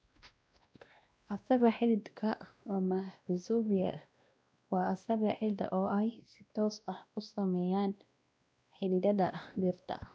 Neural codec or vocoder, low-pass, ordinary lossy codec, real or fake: codec, 16 kHz, 1 kbps, X-Codec, WavLM features, trained on Multilingual LibriSpeech; none; none; fake